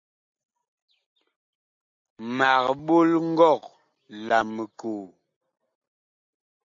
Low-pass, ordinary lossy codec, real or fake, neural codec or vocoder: 7.2 kHz; AAC, 64 kbps; real; none